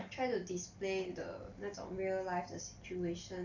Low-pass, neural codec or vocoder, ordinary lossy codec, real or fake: 7.2 kHz; none; none; real